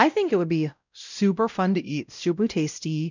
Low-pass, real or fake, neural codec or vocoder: 7.2 kHz; fake; codec, 16 kHz, 0.5 kbps, X-Codec, WavLM features, trained on Multilingual LibriSpeech